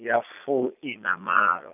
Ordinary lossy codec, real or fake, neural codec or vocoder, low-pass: none; fake; vocoder, 22.05 kHz, 80 mel bands, Vocos; 3.6 kHz